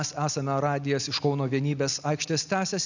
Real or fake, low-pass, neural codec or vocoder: real; 7.2 kHz; none